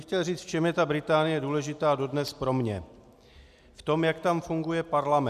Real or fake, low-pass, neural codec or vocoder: real; 14.4 kHz; none